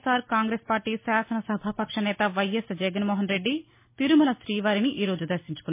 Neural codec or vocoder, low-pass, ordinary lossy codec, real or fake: none; 3.6 kHz; MP3, 24 kbps; real